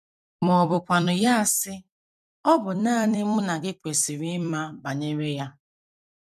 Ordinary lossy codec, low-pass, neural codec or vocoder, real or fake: none; 14.4 kHz; vocoder, 44.1 kHz, 128 mel bands every 256 samples, BigVGAN v2; fake